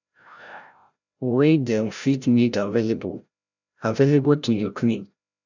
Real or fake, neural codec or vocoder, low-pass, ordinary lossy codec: fake; codec, 16 kHz, 0.5 kbps, FreqCodec, larger model; 7.2 kHz; none